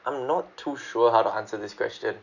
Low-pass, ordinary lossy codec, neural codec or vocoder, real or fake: 7.2 kHz; none; none; real